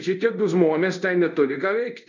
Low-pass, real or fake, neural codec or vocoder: 7.2 kHz; fake; codec, 24 kHz, 0.5 kbps, DualCodec